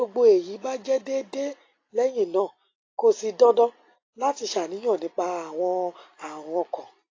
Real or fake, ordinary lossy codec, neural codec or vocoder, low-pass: real; AAC, 32 kbps; none; 7.2 kHz